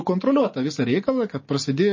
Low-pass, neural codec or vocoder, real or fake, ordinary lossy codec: 7.2 kHz; none; real; MP3, 32 kbps